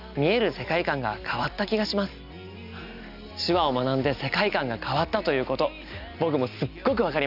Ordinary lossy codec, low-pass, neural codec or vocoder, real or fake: none; 5.4 kHz; none; real